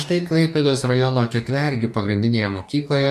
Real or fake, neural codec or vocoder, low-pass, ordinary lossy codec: fake; codec, 44.1 kHz, 2.6 kbps, DAC; 14.4 kHz; MP3, 96 kbps